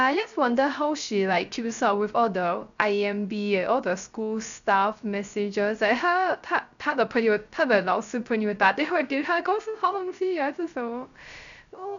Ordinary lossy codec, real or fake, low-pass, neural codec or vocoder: none; fake; 7.2 kHz; codec, 16 kHz, 0.3 kbps, FocalCodec